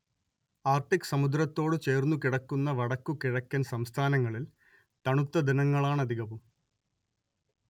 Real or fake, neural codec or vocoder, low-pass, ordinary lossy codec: real; none; 19.8 kHz; none